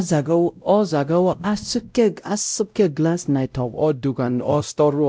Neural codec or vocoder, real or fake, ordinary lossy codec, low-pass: codec, 16 kHz, 0.5 kbps, X-Codec, WavLM features, trained on Multilingual LibriSpeech; fake; none; none